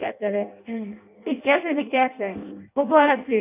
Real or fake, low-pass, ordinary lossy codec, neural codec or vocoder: fake; 3.6 kHz; none; codec, 16 kHz in and 24 kHz out, 0.6 kbps, FireRedTTS-2 codec